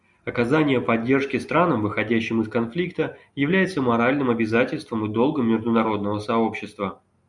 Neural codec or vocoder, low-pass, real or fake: none; 10.8 kHz; real